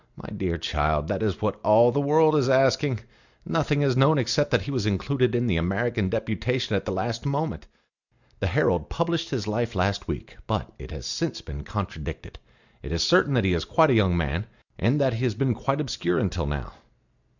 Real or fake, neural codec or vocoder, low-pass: real; none; 7.2 kHz